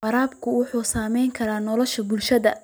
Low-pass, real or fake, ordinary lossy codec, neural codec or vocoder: none; real; none; none